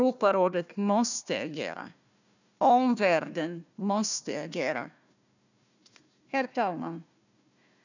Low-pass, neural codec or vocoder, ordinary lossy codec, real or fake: 7.2 kHz; codec, 16 kHz, 1 kbps, FunCodec, trained on Chinese and English, 50 frames a second; none; fake